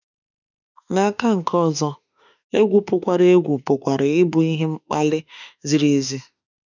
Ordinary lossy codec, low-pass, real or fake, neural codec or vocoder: none; 7.2 kHz; fake; autoencoder, 48 kHz, 32 numbers a frame, DAC-VAE, trained on Japanese speech